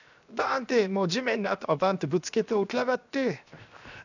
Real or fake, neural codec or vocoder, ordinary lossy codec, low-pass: fake; codec, 16 kHz, 0.7 kbps, FocalCodec; none; 7.2 kHz